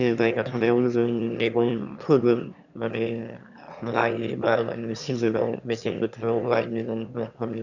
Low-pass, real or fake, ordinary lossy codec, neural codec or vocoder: 7.2 kHz; fake; none; autoencoder, 22.05 kHz, a latent of 192 numbers a frame, VITS, trained on one speaker